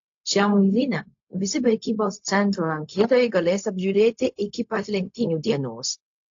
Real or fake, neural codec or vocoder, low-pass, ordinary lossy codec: fake; codec, 16 kHz, 0.4 kbps, LongCat-Audio-Codec; 7.2 kHz; AAC, 48 kbps